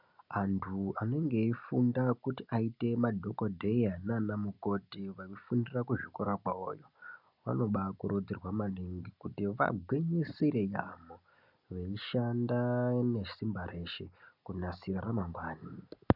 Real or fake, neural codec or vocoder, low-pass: real; none; 5.4 kHz